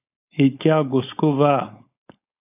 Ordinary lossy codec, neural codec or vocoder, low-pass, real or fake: AAC, 32 kbps; codec, 16 kHz, 4.8 kbps, FACodec; 3.6 kHz; fake